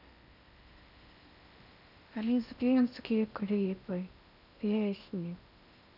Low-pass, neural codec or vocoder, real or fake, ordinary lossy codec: 5.4 kHz; codec, 16 kHz in and 24 kHz out, 0.8 kbps, FocalCodec, streaming, 65536 codes; fake; none